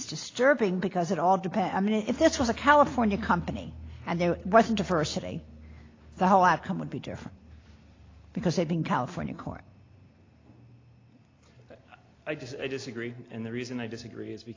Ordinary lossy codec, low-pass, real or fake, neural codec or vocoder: AAC, 32 kbps; 7.2 kHz; real; none